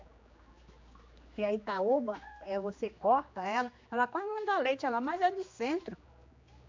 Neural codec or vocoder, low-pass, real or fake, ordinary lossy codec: codec, 16 kHz, 2 kbps, X-Codec, HuBERT features, trained on general audio; 7.2 kHz; fake; MP3, 64 kbps